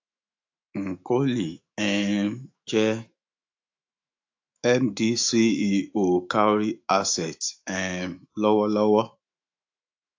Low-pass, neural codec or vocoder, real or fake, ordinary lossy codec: 7.2 kHz; autoencoder, 48 kHz, 128 numbers a frame, DAC-VAE, trained on Japanese speech; fake; AAC, 48 kbps